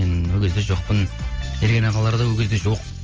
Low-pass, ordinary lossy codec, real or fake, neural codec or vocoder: 7.2 kHz; Opus, 24 kbps; real; none